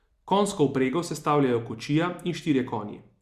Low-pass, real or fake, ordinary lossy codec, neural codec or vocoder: 14.4 kHz; real; Opus, 64 kbps; none